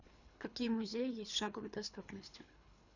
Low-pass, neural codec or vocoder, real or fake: 7.2 kHz; codec, 24 kHz, 3 kbps, HILCodec; fake